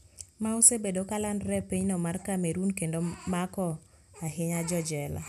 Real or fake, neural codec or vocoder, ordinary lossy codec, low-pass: real; none; none; 14.4 kHz